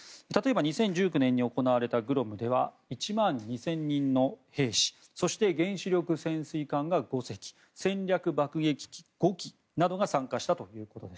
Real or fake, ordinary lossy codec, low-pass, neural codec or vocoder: real; none; none; none